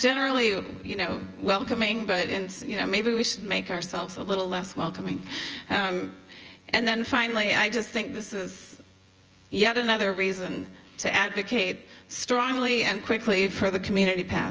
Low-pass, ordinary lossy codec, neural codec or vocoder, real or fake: 7.2 kHz; Opus, 24 kbps; vocoder, 24 kHz, 100 mel bands, Vocos; fake